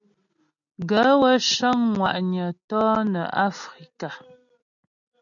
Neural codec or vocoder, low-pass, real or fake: none; 7.2 kHz; real